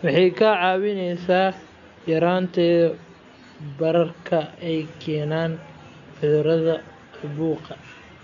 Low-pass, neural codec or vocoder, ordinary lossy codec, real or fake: 7.2 kHz; none; none; real